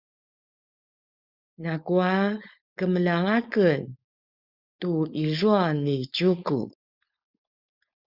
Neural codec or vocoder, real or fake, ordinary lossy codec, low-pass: codec, 16 kHz, 4.8 kbps, FACodec; fake; Opus, 64 kbps; 5.4 kHz